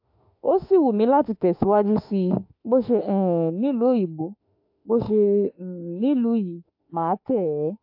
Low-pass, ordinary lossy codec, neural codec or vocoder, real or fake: 5.4 kHz; AAC, 48 kbps; autoencoder, 48 kHz, 32 numbers a frame, DAC-VAE, trained on Japanese speech; fake